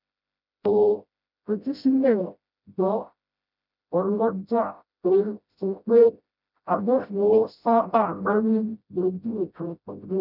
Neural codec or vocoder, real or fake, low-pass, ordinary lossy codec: codec, 16 kHz, 0.5 kbps, FreqCodec, smaller model; fake; 5.4 kHz; none